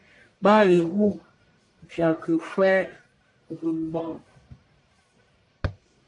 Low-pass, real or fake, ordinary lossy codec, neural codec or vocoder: 10.8 kHz; fake; AAC, 48 kbps; codec, 44.1 kHz, 1.7 kbps, Pupu-Codec